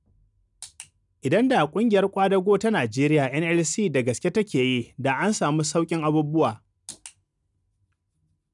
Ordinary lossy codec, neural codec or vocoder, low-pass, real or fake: none; none; 10.8 kHz; real